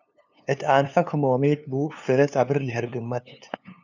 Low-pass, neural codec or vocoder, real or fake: 7.2 kHz; codec, 16 kHz, 2 kbps, FunCodec, trained on LibriTTS, 25 frames a second; fake